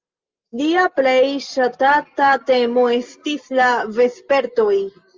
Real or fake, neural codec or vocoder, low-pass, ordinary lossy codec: real; none; 7.2 kHz; Opus, 32 kbps